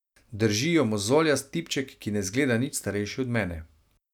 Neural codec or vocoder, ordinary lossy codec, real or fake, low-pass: none; none; real; 19.8 kHz